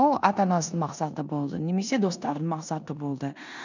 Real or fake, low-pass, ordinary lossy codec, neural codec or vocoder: fake; 7.2 kHz; none; codec, 16 kHz in and 24 kHz out, 0.9 kbps, LongCat-Audio-Codec, four codebook decoder